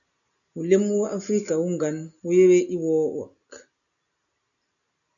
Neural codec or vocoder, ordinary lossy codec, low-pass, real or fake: none; MP3, 96 kbps; 7.2 kHz; real